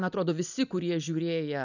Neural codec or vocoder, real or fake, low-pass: none; real; 7.2 kHz